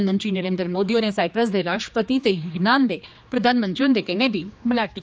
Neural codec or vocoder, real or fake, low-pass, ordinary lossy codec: codec, 16 kHz, 2 kbps, X-Codec, HuBERT features, trained on general audio; fake; none; none